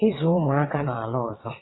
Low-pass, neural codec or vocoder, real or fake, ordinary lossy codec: 7.2 kHz; vocoder, 22.05 kHz, 80 mel bands, WaveNeXt; fake; AAC, 16 kbps